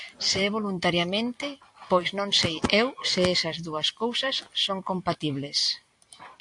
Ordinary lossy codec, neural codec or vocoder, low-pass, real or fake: AAC, 64 kbps; none; 10.8 kHz; real